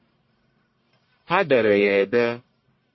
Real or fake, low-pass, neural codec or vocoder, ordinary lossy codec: fake; 7.2 kHz; codec, 44.1 kHz, 1.7 kbps, Pupu-Codec; MP3, 24 kbps